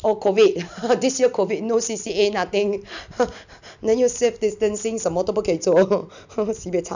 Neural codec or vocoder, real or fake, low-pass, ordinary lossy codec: none; real; 7.2 kHz; none